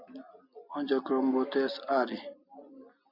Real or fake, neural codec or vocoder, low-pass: real; none; 5.4 kHz